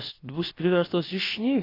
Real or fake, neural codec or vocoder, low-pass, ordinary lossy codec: fake; codec, 16 kHz, about 1 kbps, DyCAST, with the encoder's durations; 5.4 kHz; AAC, 24 kbps